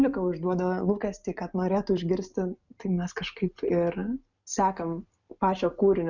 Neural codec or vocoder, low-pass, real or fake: none; 7.2 kHz; real